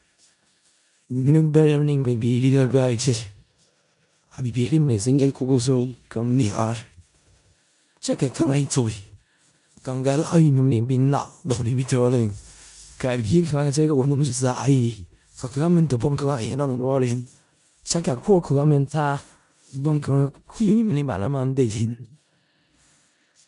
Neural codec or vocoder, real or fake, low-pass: codec, 16 kHz in and 24 kHz out, 0.4 kbps, LongCat-Audio-Codec, four codebook decoder; fake; 10.8 kHz